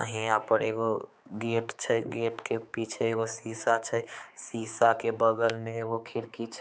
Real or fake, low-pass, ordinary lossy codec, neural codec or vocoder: fake; none; none; codec, 16 kHz, 4 kbps, X-Codec, HuBERT features, trained on balanced general audio